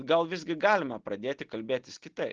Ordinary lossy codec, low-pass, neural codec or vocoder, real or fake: Opus, 16 kbps; 7.2 kHz; none; real